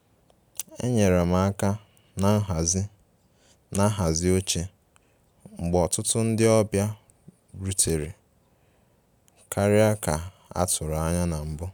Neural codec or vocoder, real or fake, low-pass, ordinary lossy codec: none; real; none; none